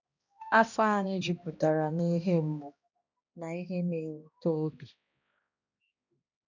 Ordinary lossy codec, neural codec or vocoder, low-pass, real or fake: none; codec, 16 kHz, 1 kbps, X-Codec, HuBERT features, trained on balanced general audio; 7.2 kHz; fake